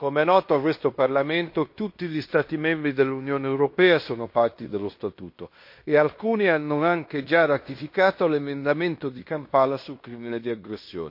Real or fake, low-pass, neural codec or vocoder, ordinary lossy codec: fake; 5.4 kHz; codec, 16 kHz in and 24 kHz out, 0.9 kbps, LongCat-Audio-Codec, fine tuned four codebook decoder; MP3, 32 kbps